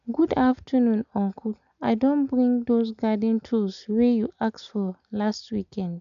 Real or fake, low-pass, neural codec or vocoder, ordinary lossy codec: real; 7.2 kHz; none; MP3, 96 kbps